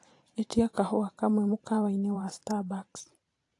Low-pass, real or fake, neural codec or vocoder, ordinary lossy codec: 10.8 kHz; fake; vocoder, 44.1 kHz, 128 mel bands every 512 samples, BigVGAN v2; AAC, 48 kbps